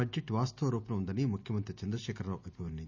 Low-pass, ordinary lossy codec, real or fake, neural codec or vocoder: 7.2 kHz; none; real; none